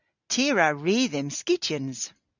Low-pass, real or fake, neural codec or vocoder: 7.2 kHz; real; none